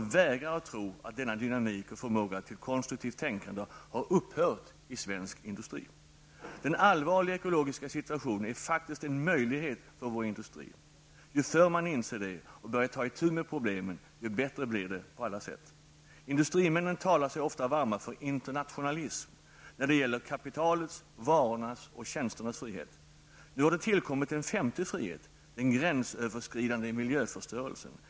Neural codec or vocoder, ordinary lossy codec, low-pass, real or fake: none; none; none; real